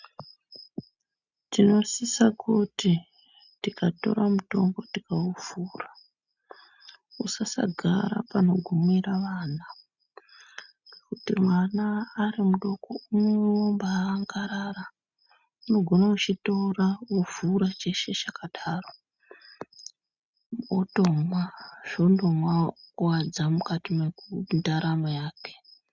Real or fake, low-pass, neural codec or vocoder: real; 7.2 kHz; none